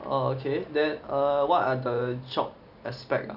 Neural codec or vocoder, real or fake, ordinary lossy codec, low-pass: none; real; none; 5.4 kHz